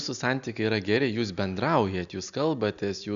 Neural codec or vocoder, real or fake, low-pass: none; real; 7.2 kHz